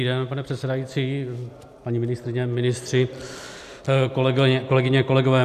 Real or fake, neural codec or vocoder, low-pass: real; none; 14.4 kHz